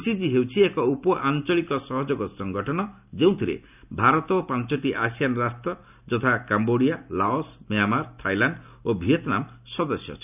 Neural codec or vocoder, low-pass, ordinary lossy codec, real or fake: none; 3.6 kHz; none; real